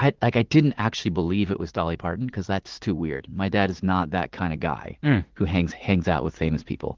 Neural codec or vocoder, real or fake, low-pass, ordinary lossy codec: vocoder, 44.1 kHz, 80 mel bands, Vocos; fake; 7.2 kHz; Opus, 16 kbps